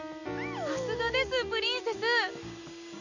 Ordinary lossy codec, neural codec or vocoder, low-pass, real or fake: none; none; 7.2 kHz; real